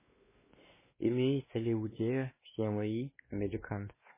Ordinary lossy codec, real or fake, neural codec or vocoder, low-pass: MP3, 16 kbps; fake; codec, 16 kHz, 2 kbps, X-Codec, WavLM features, trained on Multilingual LibriSpeech; 3.6 kHz